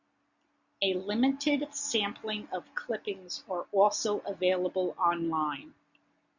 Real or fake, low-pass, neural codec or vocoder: real; 7.2 kHz; none